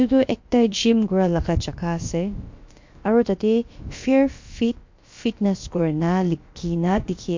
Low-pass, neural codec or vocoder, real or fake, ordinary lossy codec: 7.2 kHz; codec, 16 kHz, about 1 kbps, DyCAST, with the encoder's durations; fake; MP3, 48 kbps